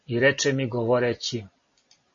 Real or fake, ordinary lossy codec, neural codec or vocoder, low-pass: real; MP3, 32 kbps; none; 7.2 kHz